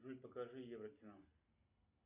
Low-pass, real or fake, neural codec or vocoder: 3.6 kHz; real; none